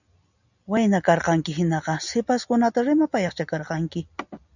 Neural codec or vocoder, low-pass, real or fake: none; 7.2 kHz; real